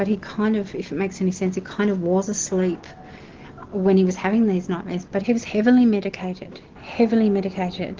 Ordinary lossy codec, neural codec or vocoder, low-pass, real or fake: Opus, 16 kbps; none; 7.2 kHz; real